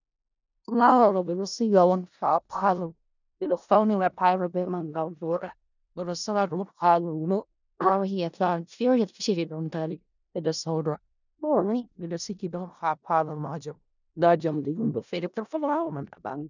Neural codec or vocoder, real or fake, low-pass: codec, 16 kHz in and 24 kHz out, 0.4 kbps, LongCat-Audio-Codec, four codebook decoder; fake; 7.2 kHz